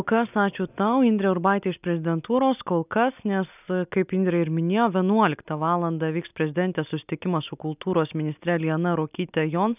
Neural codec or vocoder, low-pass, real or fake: none; 3.6 kHz; real